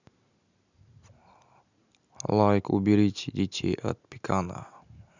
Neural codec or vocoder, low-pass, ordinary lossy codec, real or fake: none; 7.2 kHz; none; real